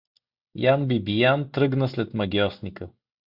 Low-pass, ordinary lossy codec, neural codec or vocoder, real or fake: 5.4 kHz; Opus, 64 kbps; none; real